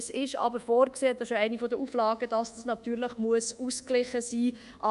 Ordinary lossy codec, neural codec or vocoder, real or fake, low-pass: none; codec, 24 kHz, 1.2 kbps, DualCodec; fake; 10.8 kHz